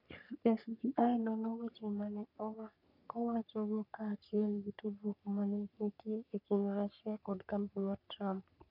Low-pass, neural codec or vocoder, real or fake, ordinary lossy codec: 5.4 kHz; codec, 44.1 kHz, 3.4 kbps, Pupu-Codec; fake; none